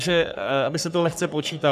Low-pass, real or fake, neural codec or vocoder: 14.4 kHz; fake; codec, 44.1 kHz, 3.4 kbps, Pupu-Codec